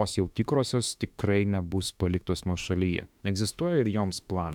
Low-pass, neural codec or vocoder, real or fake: 19.8 kHz; autoencoder, 48 kHz, 32 numbers a frame, DAC-VAE, trained on Japanese speech; fake